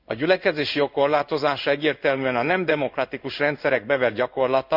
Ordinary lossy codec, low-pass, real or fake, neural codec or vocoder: none; 5.4 kHz; fake; codec, 16 kHz in and 24 kHz out, 1 kbps, XY-Tokenizer